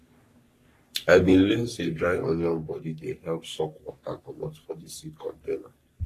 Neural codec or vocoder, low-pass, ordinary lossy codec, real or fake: codec, 44.1 kHz, 3.4 kbps, Pupu-Codec; 14.4 kHz; AAC, 48 kbps; fake